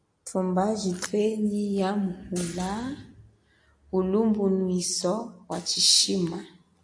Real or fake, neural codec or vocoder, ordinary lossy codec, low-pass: real; none; AAC, 48 kbps; 9.9 kHz